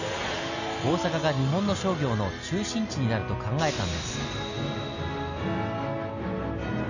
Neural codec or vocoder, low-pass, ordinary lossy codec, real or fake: none; 7.2 kHz; none; real